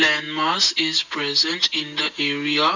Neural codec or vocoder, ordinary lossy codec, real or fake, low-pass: none; none; real; 7.2 kHz